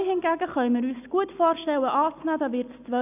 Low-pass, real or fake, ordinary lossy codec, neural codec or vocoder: 3.6 kHz; real; none; none